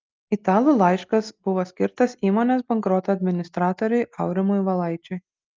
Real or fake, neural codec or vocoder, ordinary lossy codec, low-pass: real; none; Opus, 32 kbps; 7.2 kHz